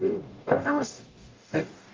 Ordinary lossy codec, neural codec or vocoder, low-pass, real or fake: Opus, 24 kbps; codec, 44.1 kHz, 0.9 kbps, DAC; 7.2 kHz; fake